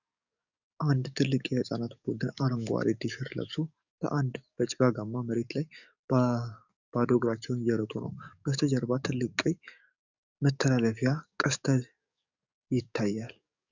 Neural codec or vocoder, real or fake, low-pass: codec, 44.1 kHz, 7.8 kbps, DAC; fake; 7.2 kHz